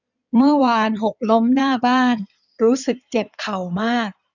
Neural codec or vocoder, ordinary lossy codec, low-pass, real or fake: codec, 16 kHz in and 24 kHz out, 2.2 kbps, FireRedTTS-2 codec; none; 7.2 kHz; fake